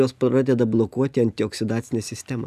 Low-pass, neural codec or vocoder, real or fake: 14.4 kHz; none; real